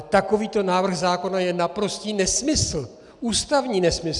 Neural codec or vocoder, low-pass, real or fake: none; 10.8 kHz; real